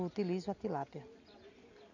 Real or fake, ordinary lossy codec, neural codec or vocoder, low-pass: real; none; none; 7.2 kHz